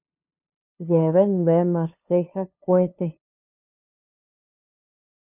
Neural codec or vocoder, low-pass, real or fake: codec, 16 kHz, 2 kbps, FunCodec, trained on LibriTTS, 25 frames a second; 3.6 kHz; fake